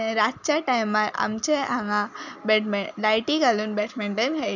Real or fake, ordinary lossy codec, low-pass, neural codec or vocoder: real; none; 7.2 kHz; none